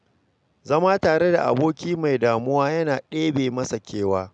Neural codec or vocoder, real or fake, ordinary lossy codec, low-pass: none; real; none; 9.9 kHz